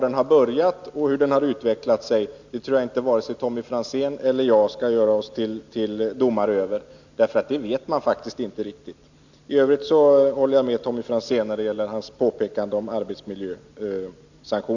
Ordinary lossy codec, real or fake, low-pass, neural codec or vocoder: none; real; 7.2 kHz; none